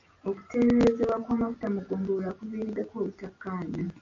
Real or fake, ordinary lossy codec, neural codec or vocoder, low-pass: real; AAC, 48 kbps; none; 7.2 kHz